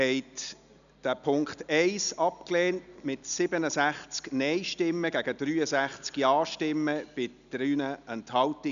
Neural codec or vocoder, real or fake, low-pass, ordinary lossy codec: none; real; 7.2 kHz; none